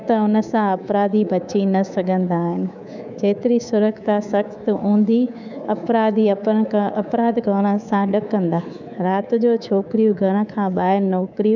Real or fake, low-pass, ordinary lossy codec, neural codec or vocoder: fake; 7.2 kHz; none; codec, 24 kHz, 3.1 kbps, DualCodec